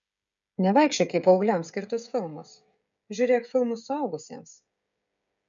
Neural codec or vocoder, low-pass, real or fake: codec, 16 kHz, 16 kbps, FreqCodec, smaller model; 7.2 kHz; fake